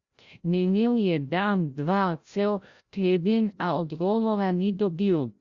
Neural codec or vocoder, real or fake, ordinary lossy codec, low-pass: codec, 16 kHz, 0.5 kbps, FreqCodec, larger model; fake; Opus, 64 kbps; 7.2 kHz